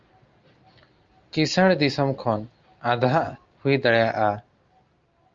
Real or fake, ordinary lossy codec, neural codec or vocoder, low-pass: real; Opus, 32 kbps; none; 7.2 kHz